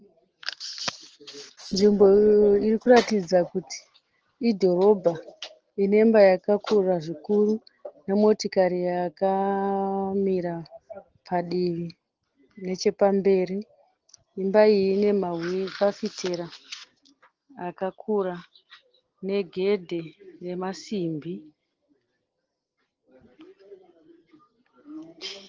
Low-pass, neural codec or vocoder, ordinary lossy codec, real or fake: 7.2 kHz; none; Opus, 16 kbps; real